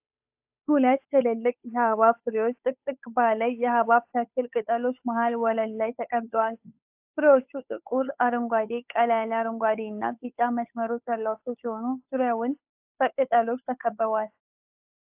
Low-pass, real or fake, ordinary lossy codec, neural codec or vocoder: 3.6 kHz; fake; AAC, 32 kbps; codec, 16 kHz, 8 kbps, FunCodec, trained on Chinese and English, 25 frames a second